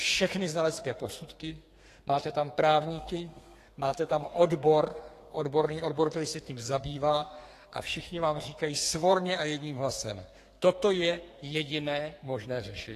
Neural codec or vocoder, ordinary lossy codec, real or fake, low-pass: codec, 32 kHz, 1.9 kbps, SNAC; AAC, 48 kbps; fake; 14.4 kHz